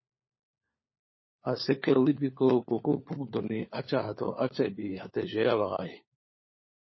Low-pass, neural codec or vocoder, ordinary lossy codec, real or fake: 7.2 kHz; codec, 16 kHz, 4 kbps, FunCodec, trained on LibriTTS, 50 frames a second; MP3, 24 kbps; fake